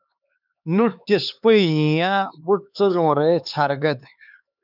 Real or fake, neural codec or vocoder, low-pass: fake; codec, 16 kHz, 4 kbps, X-Codec, HuBERT features, trained on LibriSpeech; 5.4 kHz